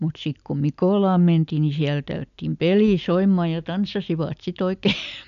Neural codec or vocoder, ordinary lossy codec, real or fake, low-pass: none; none; real; 7.2 kHz